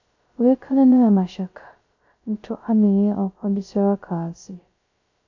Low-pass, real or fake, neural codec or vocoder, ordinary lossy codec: 7.2 kHz; fake; codec, 16 kHz, 0.2 kbps, FocalCodec; AAC, 48 kbps